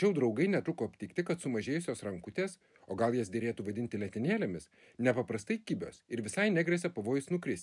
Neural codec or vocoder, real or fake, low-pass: none; real; 10.8 kHz